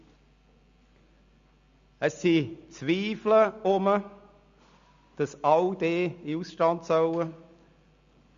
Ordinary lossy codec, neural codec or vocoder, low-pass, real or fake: Opus, 64 kbps; none; 7.2 kHz; real